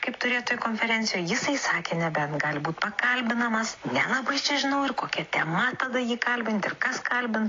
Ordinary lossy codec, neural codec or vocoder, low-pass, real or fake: AAC, 32 kbps; none; 7.2 kHz; real